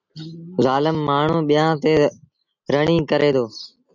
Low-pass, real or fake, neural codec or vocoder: 7.2 kHz; real; none